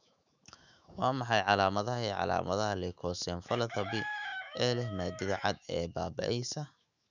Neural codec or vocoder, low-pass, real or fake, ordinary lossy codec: autoencoder, 48 kHz, 128 numbers a frame, DAC-VAE, trained on Japanese speech; 7.2 kHz; fake; none